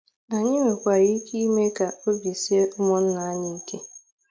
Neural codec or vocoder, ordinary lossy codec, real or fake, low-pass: none; none; real; none